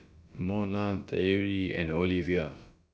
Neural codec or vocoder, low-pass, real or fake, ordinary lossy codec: codec, 16 kHz, about 1 kbps, DyCAST, with the encoder's durations; none; fake; none